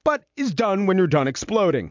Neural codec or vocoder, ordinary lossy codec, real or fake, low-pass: none; MP3, 64 kbps; real; 7.2 kHz